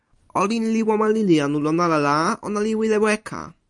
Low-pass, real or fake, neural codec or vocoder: 10.8 kHz; fake; vocoder, 44.1 kHz, 128 mel bands every 256 samples, BigVGAN v2